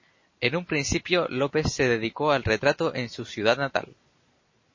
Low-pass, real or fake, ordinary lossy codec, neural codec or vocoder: 7.2 kHz; real; MP3, 32 kbps; none